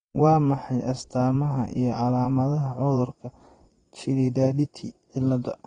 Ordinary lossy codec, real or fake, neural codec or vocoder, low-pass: AAC, 32 kbps; fake; vocoder, 22.05 kHz, 80 mel bands, Vocos; 9.9 kHz